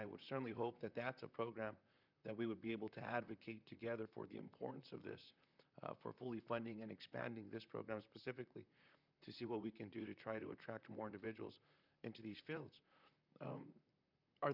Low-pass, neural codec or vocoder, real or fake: 5.4 kHz; vocoder, 44.1 kHz, 128 mel bands, Pupu-Vocoder; fake